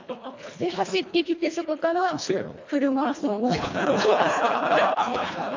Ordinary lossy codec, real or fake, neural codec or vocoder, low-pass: MP3, 48 kbps; fake; codec, 24 kHz, 1.5 kbps, HILCodec; 7.2 kHz